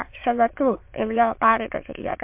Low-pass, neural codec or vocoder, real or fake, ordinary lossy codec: 3.6 kHz; autoencoder, 22.05 kHz, a latent of 192 numbers a frame, VITS, trained on many speakers; fake; MP3, 32 kbps